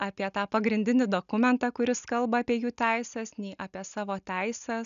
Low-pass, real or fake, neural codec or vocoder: 7.2 kHz; real; none